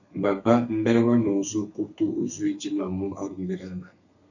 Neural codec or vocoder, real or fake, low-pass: codec, 32 kHz, 1.9 kbps, SNAC; fake; 7.2 kHz